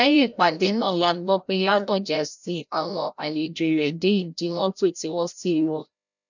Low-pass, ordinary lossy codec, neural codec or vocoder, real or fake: 7.2 kHz; none; codec, 16 kHz, 0.5 kbps, FreqCodec, larger model; fake